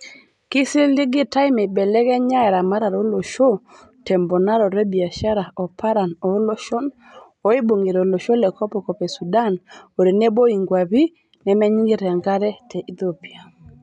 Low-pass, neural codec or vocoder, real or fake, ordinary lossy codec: 10.8 kHz; none; real; none